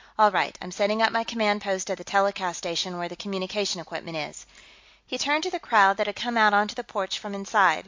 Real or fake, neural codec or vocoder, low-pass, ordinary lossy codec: real; none; 7.2 kHz; MP3, 48 kbps